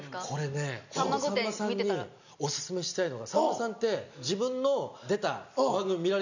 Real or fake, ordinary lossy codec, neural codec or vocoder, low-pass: real; none; none; 7.2 kHz